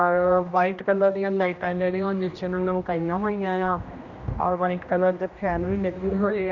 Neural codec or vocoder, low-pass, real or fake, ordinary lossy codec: codec, 16 kHz, 1 kbps, X-Codec, HuBERT features, trained on general audio; 7.2 kHz; fake; Opus, 64 kbps